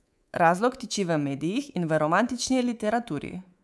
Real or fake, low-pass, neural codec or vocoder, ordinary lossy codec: fake; none; codec, 24 kHz, 3.1 kbps, DualCodec; none